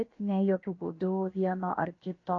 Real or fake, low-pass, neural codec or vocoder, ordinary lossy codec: fake; 7.2 kHz; codec, 16 kHz, about 1 kbps, DyCAST, with the encoder's durations; AAC, 32 kbps